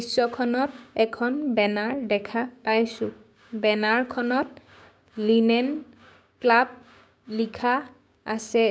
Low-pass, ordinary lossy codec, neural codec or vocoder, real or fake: none; none; codec, 16 kHz, 6 kbps, DAC; fake